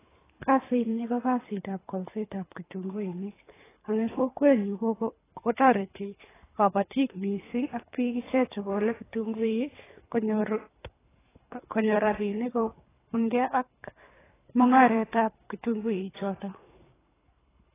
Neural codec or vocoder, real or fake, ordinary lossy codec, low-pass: codec, 24 kHz, 3 kbps, HILCodec; fake; AAC, 16 kbps; 3.6 kHz